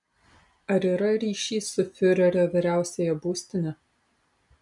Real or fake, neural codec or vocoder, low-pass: real; none; 10.8 kHz